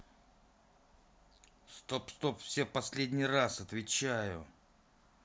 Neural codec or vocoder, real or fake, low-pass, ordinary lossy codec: none; real; none; none